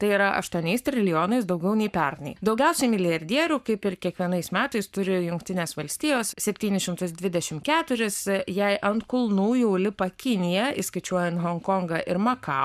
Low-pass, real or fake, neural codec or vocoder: 14.4 kHz; fake; codec, 44.1 kHz, 7.8 kbps, Pupu-Codec